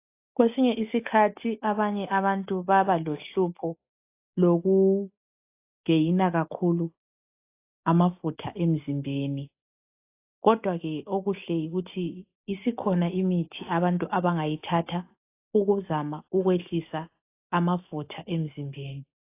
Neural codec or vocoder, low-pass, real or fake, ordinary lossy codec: none; 3.6 kHz; real; AAC, 24 kbps